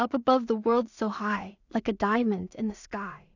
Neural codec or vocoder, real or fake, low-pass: codec, 16 kHz in and 24 kHz out, 0.4 kbps, LongCat-Audio-Codec, two codebook decoder; fake; 7.2 kHz